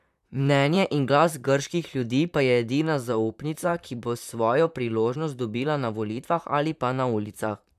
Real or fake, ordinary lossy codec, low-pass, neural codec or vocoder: fake; none; 14.4 kHz; vocoder, 44.1 kHz, 128 mel bands, Pupu-Vocoder